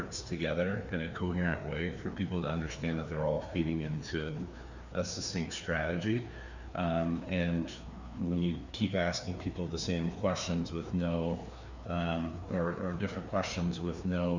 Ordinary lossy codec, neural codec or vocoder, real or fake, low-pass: Opus, 64 kbps; codec, 16 kHz, 2 kbps, FreqCodec, larger model; fake; 7.2 kHz